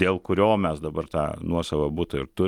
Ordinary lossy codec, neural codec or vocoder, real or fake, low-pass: Opus, 32 kbps; none; real; 19.8 kHz